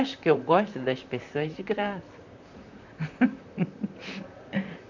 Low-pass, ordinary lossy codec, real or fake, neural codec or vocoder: 7.2 kHz; none; fake; vocoder, 44.1 kHz, 128 mel bands, Pupu-Vocoder